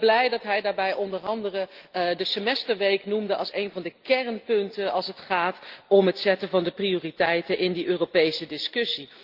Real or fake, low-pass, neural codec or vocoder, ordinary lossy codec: real; 5.4 kHz; none; Opus, 32 kbps